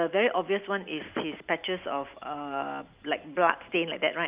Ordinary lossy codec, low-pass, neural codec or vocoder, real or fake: Opus, 24 kbps; 3.6 kHz; none; real